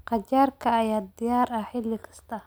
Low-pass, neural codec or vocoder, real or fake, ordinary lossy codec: none; none; real; none